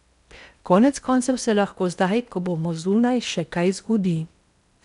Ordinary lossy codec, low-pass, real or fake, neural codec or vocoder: none; 10.8 kHz; fake; codec, 16 kHz in and 24 kHz out, 0.8 kbps, FocalCodec, streaming, 65536 codes